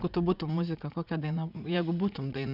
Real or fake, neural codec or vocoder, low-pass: fake; vocoder, 44.1 kHz, 80 mel bands, Vocos; 5.4 kHz